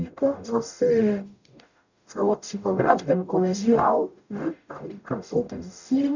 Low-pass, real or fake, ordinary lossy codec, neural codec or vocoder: 7.2 kHz; fake; none; codec, 44.1 kHz, 0.9 kbps, DAC